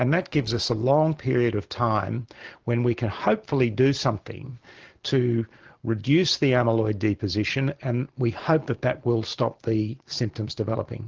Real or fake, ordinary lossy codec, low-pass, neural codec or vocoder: real; Opus, 16 kbps; 7.2 kHz; none